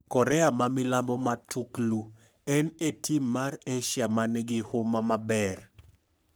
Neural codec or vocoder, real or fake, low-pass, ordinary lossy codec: codec, 44.1 kHz, 3.4 kbps, Pupu-Codec; fake; none; none